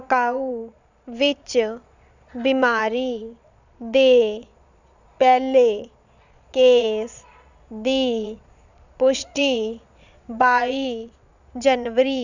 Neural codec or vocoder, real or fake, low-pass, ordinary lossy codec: vocoder, 22.05 kHz, 80 mel bands, Vocos; fake; 7.2 kHz; none